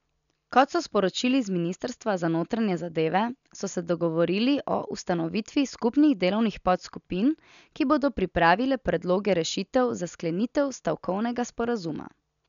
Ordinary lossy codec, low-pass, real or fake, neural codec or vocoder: none; 7.2 kHz; real; none